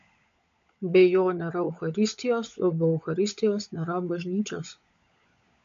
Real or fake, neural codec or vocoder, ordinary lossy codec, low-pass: fake; codec, 16 kHz, 16 kbps, FunCodec, trained on LibriTTS, 50 frames a second; MP3, 48 kbps; 7.2 kHz